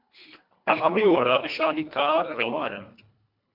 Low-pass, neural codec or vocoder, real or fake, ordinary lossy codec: 5.4 kHz; codec, 24 kHz, 1.5 kbps, HILCodec; fake; MP3, 48 kbps